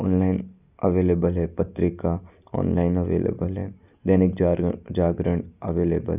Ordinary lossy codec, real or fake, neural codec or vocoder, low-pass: none; real; none; 3.6 kHz